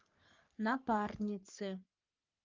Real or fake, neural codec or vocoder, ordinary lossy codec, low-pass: fake; codec, 44.1 kHz, 3.4 kbps, Pupu-Codec; Opus, 32 kbps; 7.2 kHz